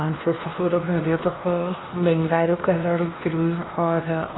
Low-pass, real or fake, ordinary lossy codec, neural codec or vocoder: 7.2 kHz; fake; AAC, 16 kbps; codec, 16 kHz, 1 kbps, X-Codec, WavLM features, trained on Multilingual LibriSpeech